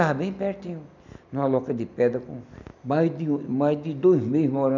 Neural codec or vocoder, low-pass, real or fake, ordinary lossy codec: none; 7.2 kHz; real; none